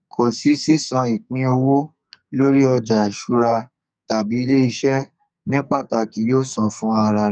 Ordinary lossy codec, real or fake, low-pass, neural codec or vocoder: none; fake; 9.9 kHz; codec, 44.1 kHz, 2.6 kbps, SNAC